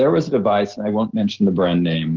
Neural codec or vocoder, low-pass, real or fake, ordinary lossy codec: none; 7.2 kHz; real; Opus, 16 kbps